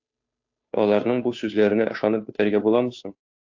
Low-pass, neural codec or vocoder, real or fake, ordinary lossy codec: 7.2 kHz; codec, 16 kHz, 2 kbps, FunCodec, trained on Chinese and English, 25 frames a second; fake; AAC, 48 kbps